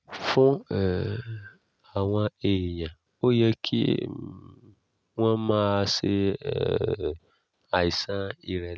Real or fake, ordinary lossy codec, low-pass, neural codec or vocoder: real; none; none; none